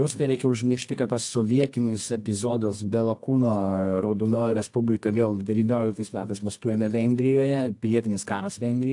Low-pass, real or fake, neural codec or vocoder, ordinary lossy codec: 10.8 kHz; fake; codec, 24 kHz, 0.9 kbps, WavTokenizer, medium music audio release; AAC, 64 kbps